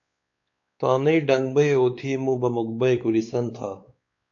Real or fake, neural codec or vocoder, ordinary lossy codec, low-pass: fake; codec, 16 kHz, 4 kbps, X-Codec, WavLM features, trained on Multilingual LibriSpeech; AAC, 64 kbps; 7.2 kHz